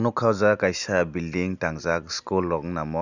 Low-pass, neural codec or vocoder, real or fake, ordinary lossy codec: 7.2 kHz; none; real; none